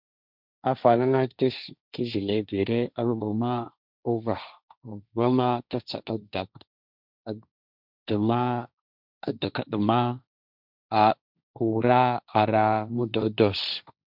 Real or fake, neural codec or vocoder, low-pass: fake; codec, 16 kHz, 1.1 kbps, Voila-Tokenizer; 5.4 kHz